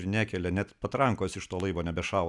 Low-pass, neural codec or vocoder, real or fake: 10.8 kHz; none; real